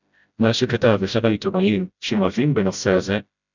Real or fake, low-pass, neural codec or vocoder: fake; 7.2 kHz; codec, 16 kHz, 0.5 kbps, FreqCodec, smaller model